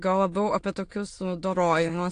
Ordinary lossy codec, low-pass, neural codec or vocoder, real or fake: AAC, 48 kbps; 9.9 kHz; autoencoder, 22.05 kHz, a latent of 192 numbers a frame, VITS, trained on many speakers; fake